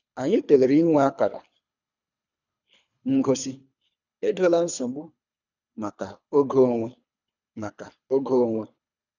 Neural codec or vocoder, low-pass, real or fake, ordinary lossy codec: codec, 24 kHz, 3 kbps, HILCodec; 7.2 kHz; fake; none